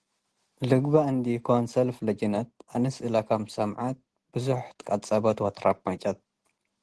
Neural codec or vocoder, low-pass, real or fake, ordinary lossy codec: none; 10.8 kHz; real; Opus, 16 kbps